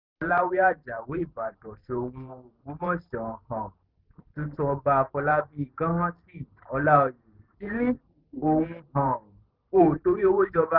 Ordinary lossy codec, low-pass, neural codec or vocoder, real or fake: Opus, 24 kbps; 5.4 kHz; vocoder, 44.1 kHz, 128 mel bands every 512 samples, BigVGAN v2; fake